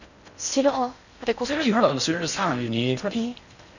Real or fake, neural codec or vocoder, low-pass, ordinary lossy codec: fake; codec, 16 kHz in and 24 kHz out, 0.6 kbps, FocalCodec, streaming, 4096 codes; 7.2 kHz; none